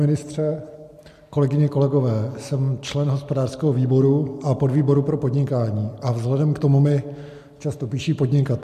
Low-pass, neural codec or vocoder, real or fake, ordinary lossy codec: 14.4 kHz; none; real; MP3, 64 kbps